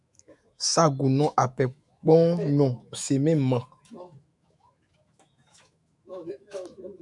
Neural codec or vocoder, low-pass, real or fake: autoencoder, 48 kHz, 128 numbers a frame, DAC-VAE, trained on Japanese speech; 10.8 kHz; fake